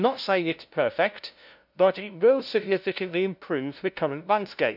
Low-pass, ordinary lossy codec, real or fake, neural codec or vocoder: 5.4 kHz; none; fake; codec, 16 kHz, 0.5 kbps, FunCodec, trained on LibriTTS, 25 frames a second